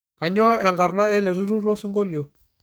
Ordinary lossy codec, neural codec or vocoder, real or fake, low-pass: none; codec, 44.1 kHz, 2.6 kbps, SNAC; fake; none